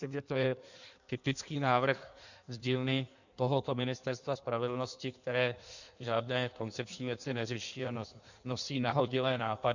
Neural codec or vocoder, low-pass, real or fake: codec, 16 kHz in and 24 kHz out, 1.1 kbps, FireRedTTS-2 codec; 7.2 kHz; fake